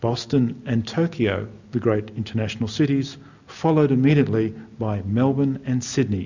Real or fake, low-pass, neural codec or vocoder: real; 7.2 kHz; none